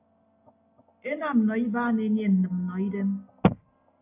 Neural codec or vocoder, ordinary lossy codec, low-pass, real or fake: none; AAC, 32 kbps; 3.6 kHz; real